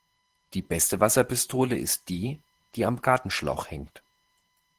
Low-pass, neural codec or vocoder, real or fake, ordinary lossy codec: 14.4 kHz; vocoder, 44.1 kHz, 128 mel bands every 512 samples, BigVGAN v2; fake; Opus, 16 kbps